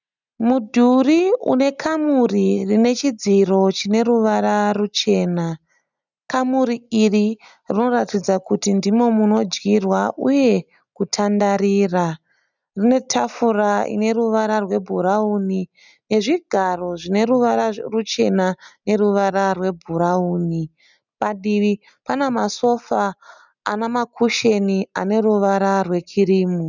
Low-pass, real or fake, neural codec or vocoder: 7.2 kHz; real; none